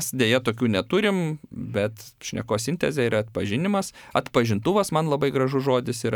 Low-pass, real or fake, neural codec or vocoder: 19.8 kHz; fake; vocoder, 44.1 kHz, 128 mel bands every 512 samples, BigVGAN v2